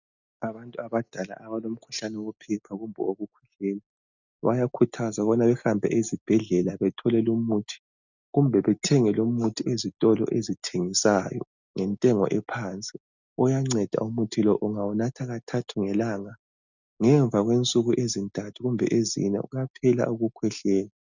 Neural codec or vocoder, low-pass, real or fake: none; 7.2 kHz; real